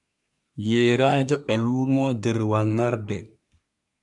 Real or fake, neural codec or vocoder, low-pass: fake; codec, 24 kHz, 1 kbps, SNAC; 10.8 kHz